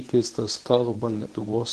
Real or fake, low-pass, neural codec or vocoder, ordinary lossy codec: fake; 10.8 kHz; codec, 24 kHz, 0.9 kbps, WavTokenizer, medium speech release version 1; Opus, 16 kbps